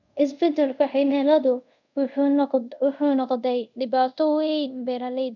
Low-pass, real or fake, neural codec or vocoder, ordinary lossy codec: 7.2 kHz; fake; codec, 24 kHz, 0.5 kbps, DualCodec; none